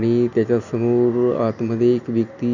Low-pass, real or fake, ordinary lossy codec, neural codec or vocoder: 7.2 kHz; real; none; none